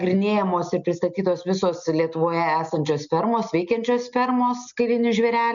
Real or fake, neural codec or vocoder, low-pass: real; none; 7.2 kHz